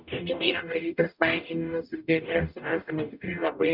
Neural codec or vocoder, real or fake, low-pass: codec, 44.1 kHz, 0.9 kbps, DAC; fake; 5.4 kHz